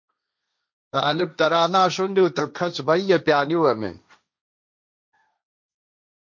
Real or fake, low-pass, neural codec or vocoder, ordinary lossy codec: fake; 7.2 kHz; codec, 16 kHz, 1.1 kbps, Voila-Tokenizer; MP3, 48 kbps